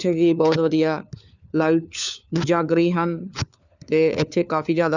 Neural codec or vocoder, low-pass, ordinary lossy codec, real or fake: codec, 16 kHz, 4 kbps, FunCodec, trained on LibriTTS, 50 frames a second; 7.2 kHz; none; fake